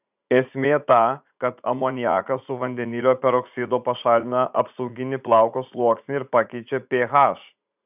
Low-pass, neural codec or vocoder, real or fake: 3.6 kHz; vocoder, 44.1 kHz, 80 mel bands, Vocos; fake